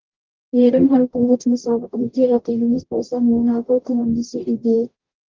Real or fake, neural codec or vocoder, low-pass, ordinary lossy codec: fake; codec, 44.1 kHz, 0.9 kbps, DAC; 7.2 kHz; Opus, 24 kbps